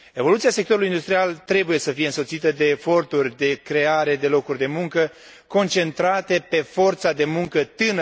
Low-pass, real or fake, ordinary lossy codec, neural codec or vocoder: none; real; none; none